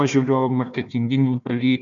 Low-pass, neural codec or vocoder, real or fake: 7.2 kHz; codec, 16 kHz, 1 kbps, FunCodec, trained on Chinese and English, 50 frames a second; fake